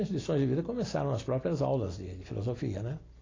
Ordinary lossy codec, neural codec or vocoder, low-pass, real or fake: AAC, 32 kbps; none; 7.2 kHz; real